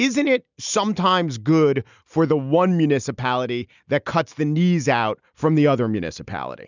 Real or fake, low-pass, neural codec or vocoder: real; 7.2 kHz; none